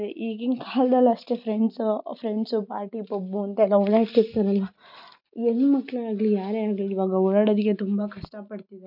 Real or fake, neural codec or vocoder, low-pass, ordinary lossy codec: real; none; 5.4 kHz; none